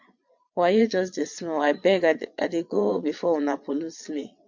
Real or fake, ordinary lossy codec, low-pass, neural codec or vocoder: fake; MP3, 64 kbps; 7.2 kHz; vocoder, 22.05 kHz, 80 mel bands, Vocos